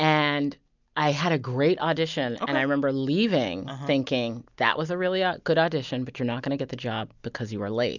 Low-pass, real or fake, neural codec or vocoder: 7.2 kHz; real; none